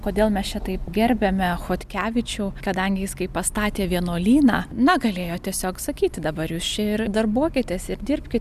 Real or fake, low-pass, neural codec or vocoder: real; 14.4 kHz; none